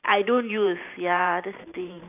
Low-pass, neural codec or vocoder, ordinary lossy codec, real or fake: 3.6 kHz; vocoder, 44.1 kHz, 128 mel bands every 512 samples, BigVGAN v2; none; fake